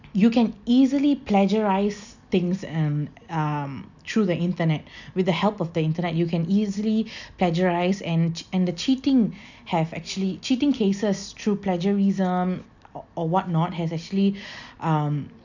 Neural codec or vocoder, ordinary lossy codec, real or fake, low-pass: none; none; real; 7.2 kHz